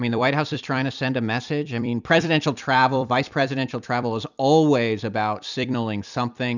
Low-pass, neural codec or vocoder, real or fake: 7.2 kHz; vocoder, 44.1 kHz, 128 mel bands every 256 samples, BigVGAN v2; fake